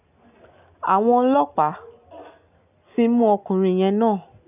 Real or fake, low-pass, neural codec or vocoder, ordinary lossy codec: real; 3.6 kHz; none; none